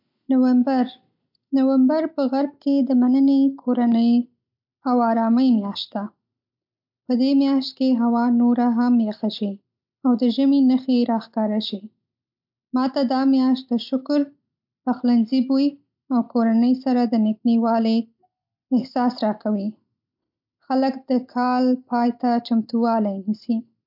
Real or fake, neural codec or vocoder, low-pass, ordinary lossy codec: real; none; 5.4 kHz; MP3, 48 kbps